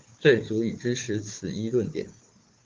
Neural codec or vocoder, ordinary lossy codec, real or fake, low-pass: codec, 16 kHz, 4 kbps, FunCodec, trained on Chinese and English, 50 frames a second; Opus, 32 kbps; fake; 7.2 kHz